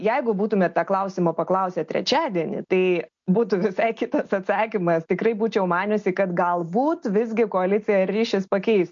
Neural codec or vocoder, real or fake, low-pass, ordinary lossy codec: none; real; 7.2 kHz; MP3, 48 kbps